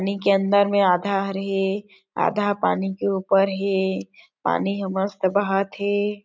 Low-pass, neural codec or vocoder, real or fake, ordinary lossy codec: none; none; real; none